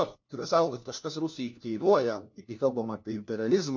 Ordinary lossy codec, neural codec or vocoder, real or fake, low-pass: MP3, 48 kbps; codec, 16 kHz, 1 kbps, FunCodec, trained on LibriTTS, 50 frames a second; fake; 7.2 kHz